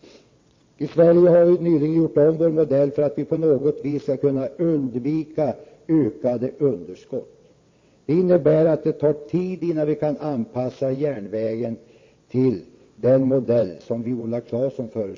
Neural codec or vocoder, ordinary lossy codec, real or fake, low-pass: vocoder, 22.05 kHz, 80 mel bands, WaveNeXt; MP3, 32 kbps; fake; 7.2 kHz